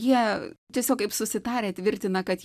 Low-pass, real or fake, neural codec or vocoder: 14.4 kHz; real; none